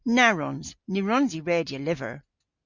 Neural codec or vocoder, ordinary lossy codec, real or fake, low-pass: none; Opus, 64 kbps; real; 7.2 kHz